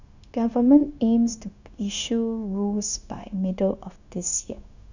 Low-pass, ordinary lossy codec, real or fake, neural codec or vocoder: 7.2 kHz; none; fake; codec, 16 kHz, 0.9 kbps, LongCat-Audio-Codec